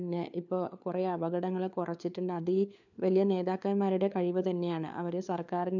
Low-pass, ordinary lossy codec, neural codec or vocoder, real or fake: 7.2 kHz; none; codec, 16 kHz, 4 kbps, FunCodec, trained on LibriTTS, 50 frames a second; fake